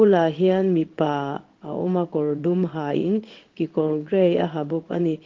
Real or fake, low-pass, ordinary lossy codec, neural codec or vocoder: fake; 7.2 kHz; Opus, 16 kbps; codec, 16 kHz in and 24 kHz out, 1 kbps, XY-Tokenizer